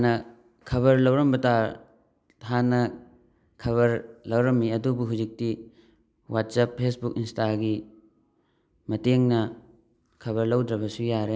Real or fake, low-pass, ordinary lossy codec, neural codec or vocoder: real; none; none; none